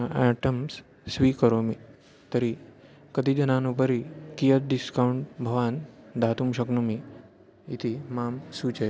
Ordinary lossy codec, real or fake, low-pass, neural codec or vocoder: none; real; none; none